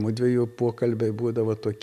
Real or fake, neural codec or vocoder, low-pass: real; none; 14.4 kHz